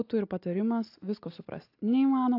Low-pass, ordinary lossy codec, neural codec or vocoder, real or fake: 5.4 kHz; AAC, 32 kbps; none; real